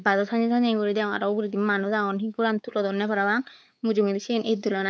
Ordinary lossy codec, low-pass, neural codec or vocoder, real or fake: none; none; codec, 16 kHz, 4 kbps, X-Codec, WavLM features, trained on Multilingual LibriSpeech; fake